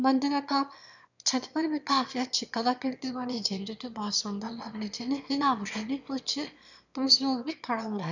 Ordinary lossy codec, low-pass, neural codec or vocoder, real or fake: none; 7.2 kHz; autoencoder, 22.05 kHz, a latent of 192 numbers a frame, VITS, trained on one speaker; fake